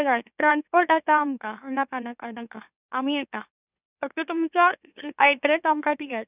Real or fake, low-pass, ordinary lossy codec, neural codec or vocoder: fake; 3.6 kHz; none; autoencoder, 44.1 kHz, a latent of 192 numbers a frame, MeloTTS